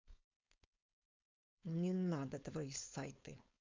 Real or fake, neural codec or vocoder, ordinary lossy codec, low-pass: fake; codec, 16 kHz, 4.8 kbps, FACodec; MP3, 64 kbps; 7.2 kHz